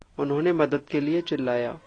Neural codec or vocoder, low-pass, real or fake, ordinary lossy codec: none; 9.9 kHz; real; AAC, 32 kbps